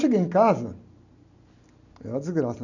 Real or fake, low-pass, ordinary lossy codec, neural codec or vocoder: real; 7.2 kHz; none; none